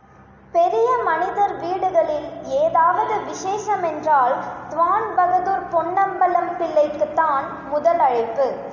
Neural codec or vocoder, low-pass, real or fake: none; 7.2 kHz; real